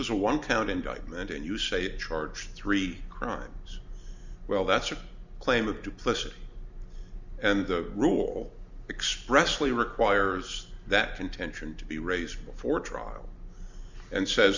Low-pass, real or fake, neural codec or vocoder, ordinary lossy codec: 7.2 kHz; real; none; Opus, 64 kbps